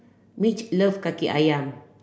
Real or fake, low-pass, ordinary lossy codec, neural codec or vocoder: real; none; none; none